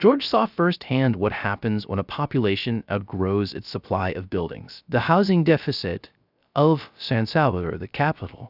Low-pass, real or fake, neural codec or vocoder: 5.4 kHz; fake; codec, 16 kHz, 0.3 kbps, FocalCodec